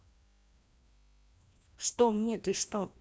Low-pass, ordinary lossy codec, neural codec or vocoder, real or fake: none; none; codec, 16 kHz, 1 kbps, FreqCodec, larger model; fake